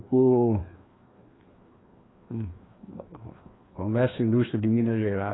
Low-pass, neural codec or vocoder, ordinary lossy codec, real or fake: 7.2 kHz; codec, 16 kHz, 2 kbps, FreqCodec, larger model; AAC, 16 kbps; fake